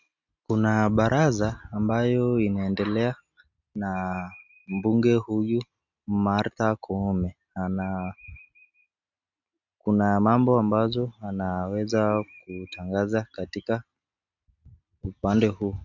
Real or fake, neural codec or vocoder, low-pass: real; none; 7.2 kHz